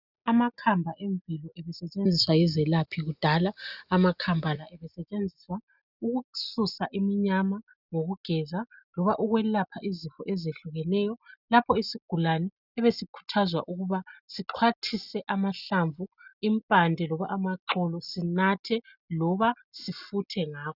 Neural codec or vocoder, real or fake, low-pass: none; real; 5.4 kHz